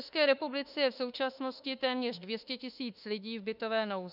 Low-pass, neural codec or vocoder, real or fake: 5.4 kHz; autoencoder, 48 kHz, 32 numbers a frame, DAC-VAE, trained on Japanese speech; fake